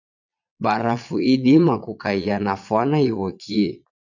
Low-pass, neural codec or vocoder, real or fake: 7.2 kHz; vocoder, 22.05 kHz, 80 mel bands, Vocos; fake